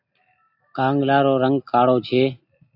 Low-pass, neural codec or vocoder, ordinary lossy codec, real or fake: 5.4 kHz; none; AAC, 48 kbps; real